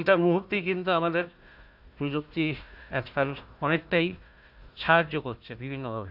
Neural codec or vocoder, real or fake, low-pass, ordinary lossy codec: codec, 16 kHz, 1 kbps, FunCodec, trained on Chinese and English, 50 frames a second; fake; 5.4 kHz; AAC, 48 kbps